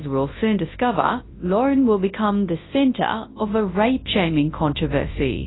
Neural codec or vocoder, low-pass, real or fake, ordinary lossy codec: codec, 24 kHz, 0.9 kbps, WavTokenizer, large speech release; 7.2 kHz; fake; AAC, 16 kbps